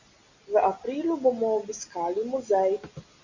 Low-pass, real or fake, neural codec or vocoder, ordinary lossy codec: 7.2 kHz; real; none; Opus, 64 kbps